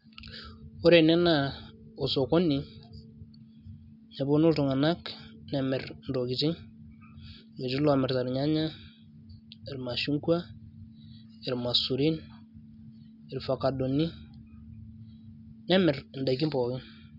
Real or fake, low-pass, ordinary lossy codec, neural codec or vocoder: real; 5.4 kHz; none; none